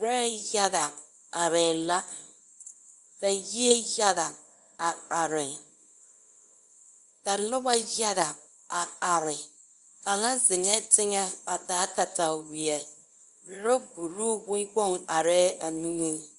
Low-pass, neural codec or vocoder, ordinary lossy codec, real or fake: 10.8 kHz; codec, 24 kHz, 0.9 kbps, WavTokenizer, small release; Opus, 64 kbps; fake